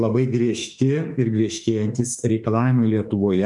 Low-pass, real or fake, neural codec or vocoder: 10.8 kHz; fake; autoencoder, 48 kHz, 32 numbers a frame, DAC-VAE, trained on Japanese speech